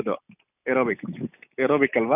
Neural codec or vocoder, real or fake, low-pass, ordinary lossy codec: none; real; 3.6 kHz; none